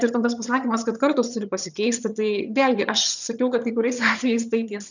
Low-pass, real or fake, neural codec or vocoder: 7.2 kHz; fake; vocoder, 22.05 kHz, 80 mel bands, HiFi-GAN